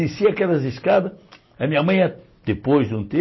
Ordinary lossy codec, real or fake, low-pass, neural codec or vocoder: MP3, 24 kbps; real; 7.2 kHz; none